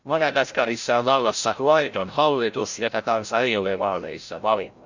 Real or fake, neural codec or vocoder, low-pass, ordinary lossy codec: fake; codec, 16 kHz, 0.5 kbps, FreqCodec, larger model; 7.2 kHz; Opus, 64 kbps